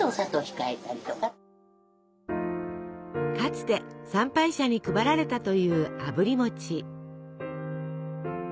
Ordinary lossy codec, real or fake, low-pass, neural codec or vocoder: none; real; none; none